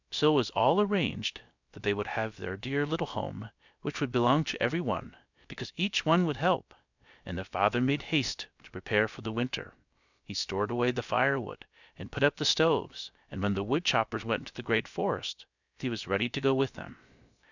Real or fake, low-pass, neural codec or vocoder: fake; 7.2 kHz; codec, 16 kHz, 0.3 kbps, FocalCodec